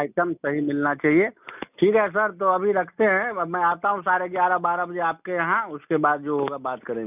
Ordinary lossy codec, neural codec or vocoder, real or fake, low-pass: none; none; real; 3.6 kHz